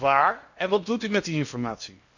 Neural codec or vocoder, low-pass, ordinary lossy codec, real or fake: codec, 16 kHz in and 24 kHz out, 0.8 kbps, FocalCodec, streaming, 65536 codes; 7.2 kHz; none; fake